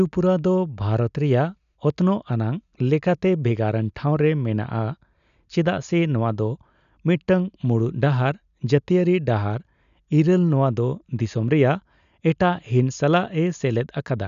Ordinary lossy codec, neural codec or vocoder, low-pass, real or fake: none; none; 7.2 kHz; real